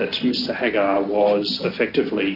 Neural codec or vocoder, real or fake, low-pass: none; real; 5.4 kHz